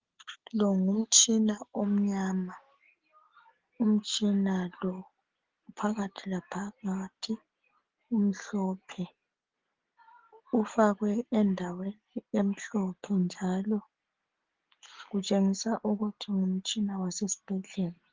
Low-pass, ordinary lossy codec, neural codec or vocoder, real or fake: 7.2 kHz; Opus, 16 kbps; none; real